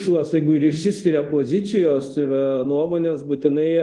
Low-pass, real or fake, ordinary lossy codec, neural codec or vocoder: 10.8 kHz; fake; Opus, 24 kbps; codec, 24 kHz, 0.5 kbps, DualCodec